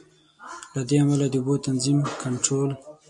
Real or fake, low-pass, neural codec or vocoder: real; 10.8 kHz; none